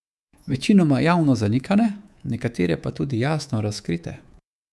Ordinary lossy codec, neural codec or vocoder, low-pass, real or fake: none; codec, 24 kHz, 3.1 kbps, DualCodec; none; fake